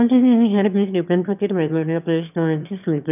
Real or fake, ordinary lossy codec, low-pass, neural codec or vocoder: fake; none; 3.6 kHz; autoencoder, 22.05 kHz, a latent of 192 numbers a frame, VITS, trained on one speaker